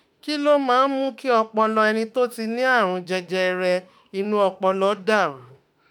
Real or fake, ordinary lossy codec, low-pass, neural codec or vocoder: fake; none; none; autoencoder, 48 kHz, 32 numbers a frame, DAC-VAE, trained on Japanese speech